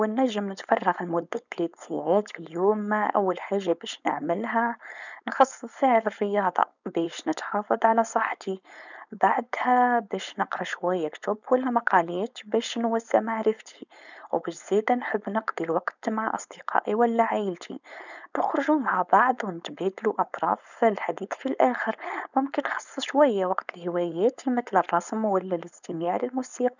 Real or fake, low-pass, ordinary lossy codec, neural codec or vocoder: fake; 7.2 kHz; none; codec, 16 kHz, 4.8 kbps, FACodec